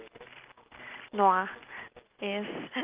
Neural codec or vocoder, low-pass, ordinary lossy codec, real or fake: none; 3.6 kHz; Opus, 24 kbps; real